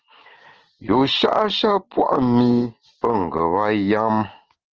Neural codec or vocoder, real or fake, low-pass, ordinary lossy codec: none; real; 7.2 kHz; Opus, 16 kbps